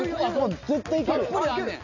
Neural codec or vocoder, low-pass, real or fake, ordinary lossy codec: none; 7.2 kHz; real; none